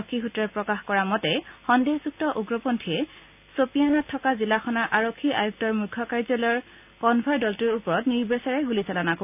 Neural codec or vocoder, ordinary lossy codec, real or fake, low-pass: none; none; real; 3.6 kHz